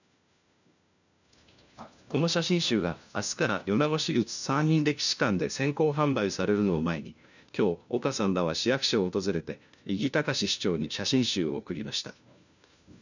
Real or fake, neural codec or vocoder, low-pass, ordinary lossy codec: fake; codec, 16 kHz, 1 kbps, FunCodec, trained on LibriTTS, 50 frames a second; 7.2 kHz; none